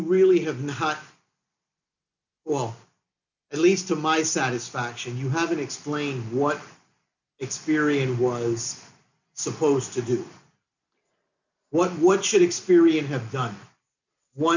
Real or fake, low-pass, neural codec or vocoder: real; 7.2 kHz; none